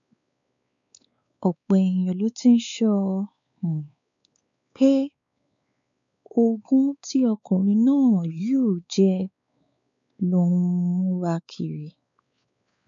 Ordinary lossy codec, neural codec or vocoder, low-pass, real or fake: MP3, 64 kbps; codec, 16 kHz, 4 kbps, X-Codec, WavLM features, trained on Multilingual LibriSpeech; 7.2 kHz; fake